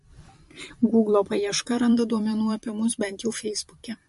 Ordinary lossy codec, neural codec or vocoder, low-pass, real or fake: MP3, 48 kbps; vocoder, 44.1 kHz, 128 mel bands every 512 samples, BigVGAN v2; 14.4 kHz; fake